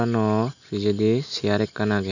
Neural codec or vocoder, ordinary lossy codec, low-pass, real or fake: none; none; 7.2 kHz; real